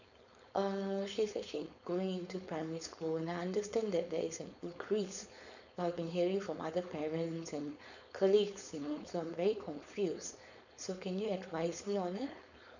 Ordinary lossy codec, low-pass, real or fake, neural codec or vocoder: none; 7.2 kHz; fake; codec, 16 kHz, 4.8 kbps, FACodec